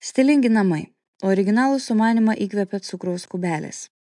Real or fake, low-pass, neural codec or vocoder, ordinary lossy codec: real; 10.8 kHz; none; MP3, 64 kbps